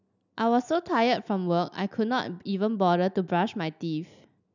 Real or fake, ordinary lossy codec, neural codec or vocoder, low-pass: real; none; none; 7.2 kHz